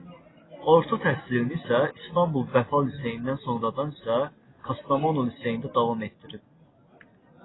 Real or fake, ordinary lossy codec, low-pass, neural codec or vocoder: real; AAC, 16 kbps; 7.2 kHz; none